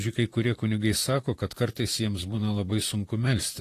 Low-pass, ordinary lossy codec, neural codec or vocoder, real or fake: 14.4 kHz; AAC, 48 kbps; vocoder, 44.1 kHz, 128 mel bands, Pupu-Vocoder; fake